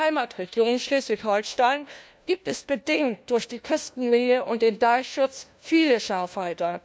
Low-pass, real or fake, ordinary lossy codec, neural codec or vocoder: none; fake; none; codec, 16 kHz, 1 kbps, FunCodec, trained on LibriTTS, 50 frames a second